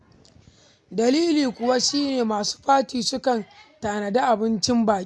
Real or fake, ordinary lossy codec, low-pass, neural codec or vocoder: real; none; none; none